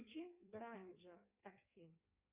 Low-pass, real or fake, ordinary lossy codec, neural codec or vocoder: 3.6 kHz; fake; Opus, 64 kbps; codec, 16 kHz in and 24 kHz out, 1.1 kbps, FireRedTTS-2 codec